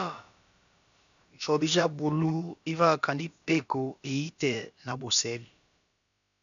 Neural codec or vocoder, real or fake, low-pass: codec, 16 kHz, about 1 kbps, DyCAST, with the encoder's durations; fake; 7.2 kHz